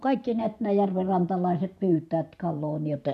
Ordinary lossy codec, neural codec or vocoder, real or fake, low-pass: none; vocoder, 44.1 kHz, 128 mel bands every 512 samples, BigVGAN v2; fake; 14.4 kHz